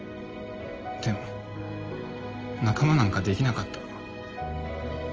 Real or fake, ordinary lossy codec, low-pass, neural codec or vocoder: real; Opus, 24 kbps; 7.2 kHz; none